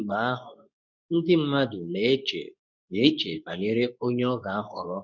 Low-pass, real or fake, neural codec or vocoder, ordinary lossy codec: 7.2 kHz; fake; codec, 24 kHz, 0.9 kbps, WavTokenizer, medium speech release version 2; none